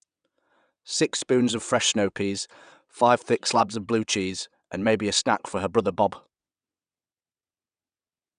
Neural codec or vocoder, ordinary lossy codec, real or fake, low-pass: vocoder, 22.05 kHz, 80 mel bands, WaveNeXt; none; fake; 9.9 kHz